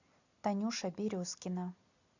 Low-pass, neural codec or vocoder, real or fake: 7.2 kHz; none; real